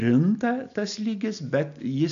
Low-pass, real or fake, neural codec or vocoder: 7.2 kHz; real; none